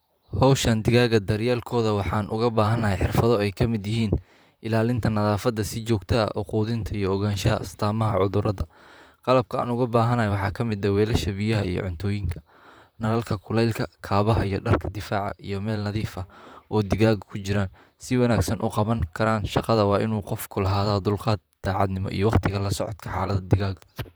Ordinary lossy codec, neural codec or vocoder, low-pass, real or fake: none; vocoder, 44.1 kHz, 128 mel bands, Pupu-Vocoder; none; fake